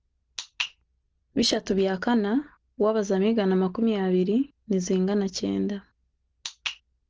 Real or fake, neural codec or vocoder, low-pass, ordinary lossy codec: real; none; 7.2 kHz; Opus, 16 kbps